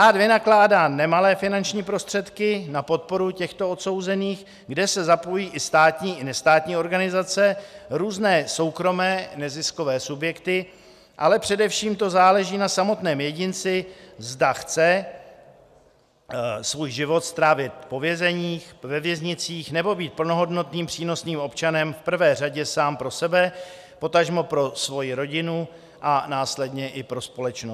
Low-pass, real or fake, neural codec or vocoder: 14.4 kHz; real; none